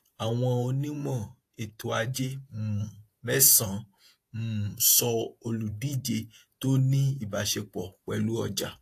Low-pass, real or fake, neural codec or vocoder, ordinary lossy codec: 14.4 kHz; fake; vocoder, 48 kHz, 128 mel bands, Vocos; AAC, 64 kbps